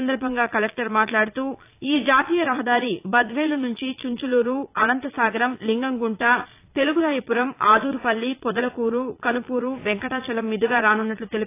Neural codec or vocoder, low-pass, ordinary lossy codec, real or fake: vocoder, 22.05 kHz, 80 mel bands, Vocos; 3.6 kHz; AAC, 24 kbps; fake